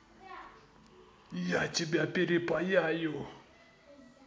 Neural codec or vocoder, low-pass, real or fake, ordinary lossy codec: none; none; real; none